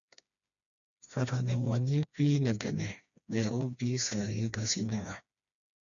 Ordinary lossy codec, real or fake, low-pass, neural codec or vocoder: MP3, 96 kbps; fake; 7.2 kHz; codec, 16 kHz, 2 kbps, FreqCodec, smaller model